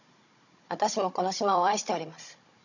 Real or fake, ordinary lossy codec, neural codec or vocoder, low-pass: fake; none; codec, 16 kHz, 16 kbps, FunCodec, trained on Chinese and English, 50 frames a second; 7.2 kHz